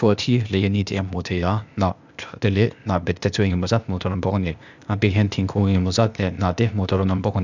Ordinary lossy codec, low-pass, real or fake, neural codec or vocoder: none; 7.2 kHz; fake; codec, 16 kHz, 0.8 kbps, ZipCodec